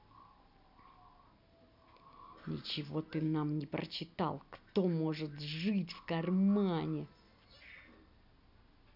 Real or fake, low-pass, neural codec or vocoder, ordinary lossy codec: real; 5.4 kHz; none; none